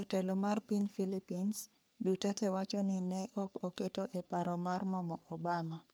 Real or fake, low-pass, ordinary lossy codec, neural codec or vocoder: fake; none; none; codec, 44.1 kHz, 3.4 kbps, Pupu-Codec